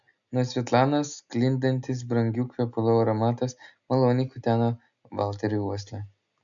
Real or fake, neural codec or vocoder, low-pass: real; none; 7.2 kHz